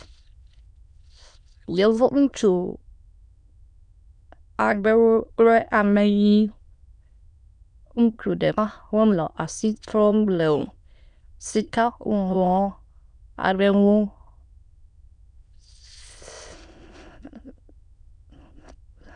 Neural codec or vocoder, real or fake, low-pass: autoencoder, 22.05 kHz, a latent of 192 numbers a frame, VITS, trained on many speakers; fake; 9.9 kHz